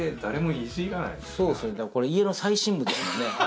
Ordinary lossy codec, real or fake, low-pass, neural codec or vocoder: none; real; none; none